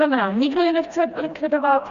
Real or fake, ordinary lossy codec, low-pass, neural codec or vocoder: fake; Opus, 64 kbps; 7.2 kHz; codec, 16 kHz, 1 kbps, FreqCodec, smaller model